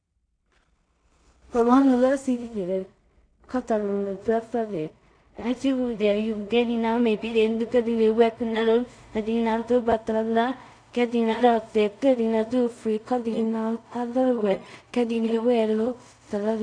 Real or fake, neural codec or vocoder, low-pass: fake; codec, 16 kHz in and 24 kHz out, 0.4 kbps, LongCat-Audio-Codec, two codebook decoder; 9.9 kHz